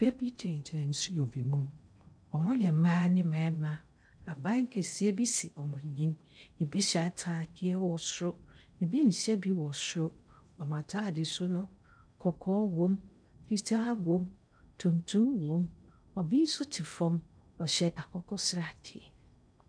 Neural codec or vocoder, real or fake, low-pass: codec, 16 kHz in and 24 kHz out, 0.6 kbps, FocalCodec, streaming, 2048 codes; fake; 9.9 kHz